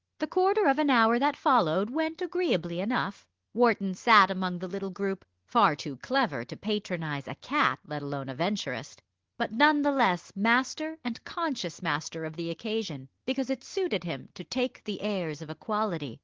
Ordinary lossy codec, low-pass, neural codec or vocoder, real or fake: Opus, 16 kbps; 7.2 kHz; none; real